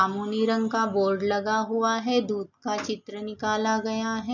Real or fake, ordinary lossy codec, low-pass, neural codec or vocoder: real; none; 7.2 kHz; none